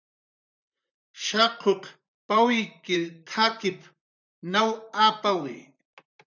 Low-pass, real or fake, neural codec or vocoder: 7.2 kHz; fake; vocoder, 44.1 kHz, 128 mel bands, Pupu-Vocoder